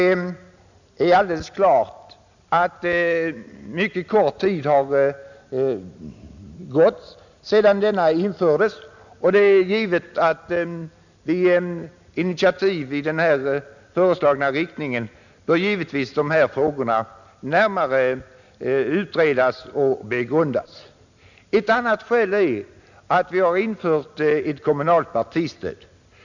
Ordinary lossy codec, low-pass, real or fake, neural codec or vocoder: none; 7.2 kHz; real; none